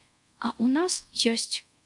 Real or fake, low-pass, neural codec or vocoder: fake; 10.8 kHz; codec, 24 kHz, 0.5 kbps, DualCodec